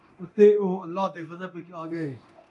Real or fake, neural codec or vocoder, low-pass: fake; codec, 24 kHz, 0.9 kbps, DualCodec; 10.8 kHz